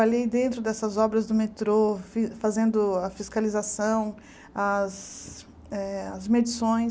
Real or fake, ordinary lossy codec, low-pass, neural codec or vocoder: real; none; none; none